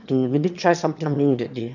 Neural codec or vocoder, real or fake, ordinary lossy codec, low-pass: autoencoder, 22.05 kHz, a latent of 192 numbers a frame, VITS, trained on one speaker; fake; none; 7.2 kHz